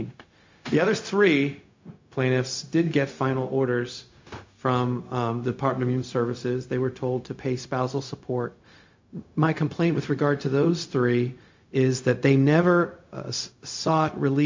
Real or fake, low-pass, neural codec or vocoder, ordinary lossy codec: fake; 7.2 kHz; codec, 16 kHz, 0.4 kbps, LongCat-Audio-Codec; MP3, 48 kbps